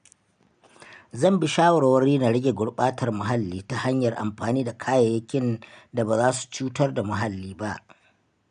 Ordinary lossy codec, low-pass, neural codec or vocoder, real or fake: none; 9.9 kHz; none; real